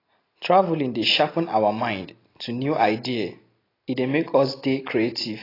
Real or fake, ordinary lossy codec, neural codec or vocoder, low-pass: real; AAC, 24 kbps; none; 5.4 kHz